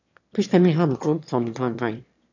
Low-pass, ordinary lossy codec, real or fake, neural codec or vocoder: 7.2 kHz; none; fake; autoencoder, 22.05 kHz, a latent of 192 numbers a frame, VITS, trained on one speaker